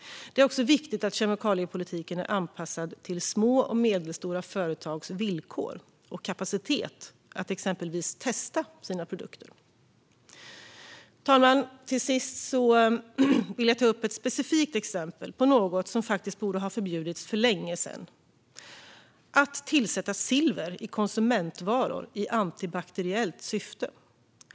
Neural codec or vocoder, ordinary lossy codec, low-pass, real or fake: none; none; none; real